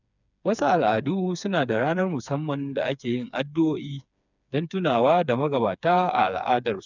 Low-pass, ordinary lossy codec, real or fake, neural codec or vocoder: 7.2 kHz; none; fake; codec, 16 kHz, 4 kbps, FreqCodec, smaller model